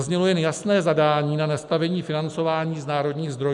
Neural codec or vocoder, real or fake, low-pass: none; real; 10.8 kHz